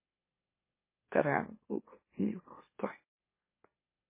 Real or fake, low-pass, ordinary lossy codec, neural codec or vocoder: fake; 3.6 kHz; MP3, 16 kbps; autoencoder, 44.1 kHz, a latent of 192 numbers a frame, MeloTTS